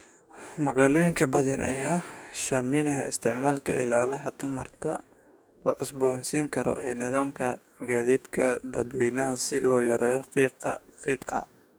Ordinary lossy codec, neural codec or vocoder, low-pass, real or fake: none; codec, 44.1 kHz, 2.6 kbps, DAC; none; fake